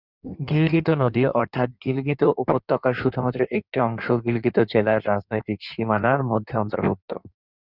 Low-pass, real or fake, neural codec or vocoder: 5.4 kHz; fake; codec, 16 kHz in and 24 kHz out, 1.1 kbps, FireRedTTS-2 codec